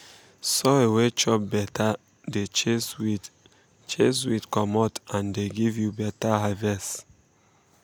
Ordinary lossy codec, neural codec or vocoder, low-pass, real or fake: none; none; none; real